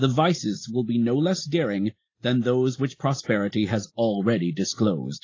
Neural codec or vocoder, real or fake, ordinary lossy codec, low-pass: none; real; AAC, 32 kbps; 7.2 kHz